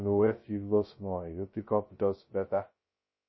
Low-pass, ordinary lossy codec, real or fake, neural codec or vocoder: 7.2 kHz; MP3, 24 kbps; fake; codec, 16 kHz, 0.2 kbps, FocalCodec